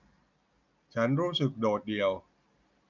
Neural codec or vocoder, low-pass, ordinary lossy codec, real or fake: none; 7.2 kHz; none; real